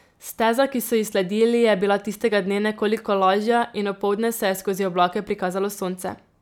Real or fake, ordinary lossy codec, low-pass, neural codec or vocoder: real; none; 19.8 kHz; none